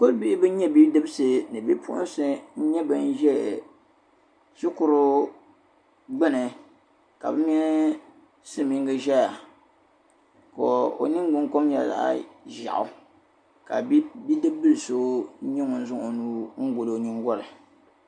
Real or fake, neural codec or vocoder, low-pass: real; none; 9.9 kHz